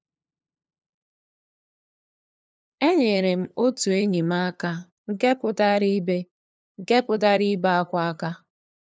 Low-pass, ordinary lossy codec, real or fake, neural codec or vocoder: none; none; fake; codec, 16 kHz, 2 kbps, FunCodec, trained on LibriTTS, 25 frames a second